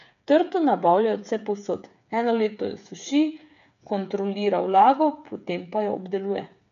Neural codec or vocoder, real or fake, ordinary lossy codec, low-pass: codec, 16 kHz, 8 kbps, FreqCodec, smaller model; fake; none; 7.2 kHz